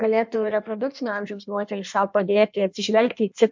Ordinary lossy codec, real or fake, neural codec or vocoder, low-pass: MP3, 64 kbps; fake; codec, 16 kHz in and 24 kHz out, 1.1 kbps, FireRedTTS-2 codec; 7.2 kHz